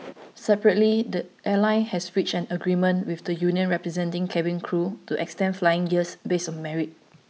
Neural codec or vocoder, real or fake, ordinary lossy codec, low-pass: none; real; none; none